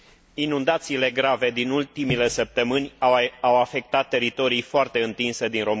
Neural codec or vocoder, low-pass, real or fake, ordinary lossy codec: none; none; real; none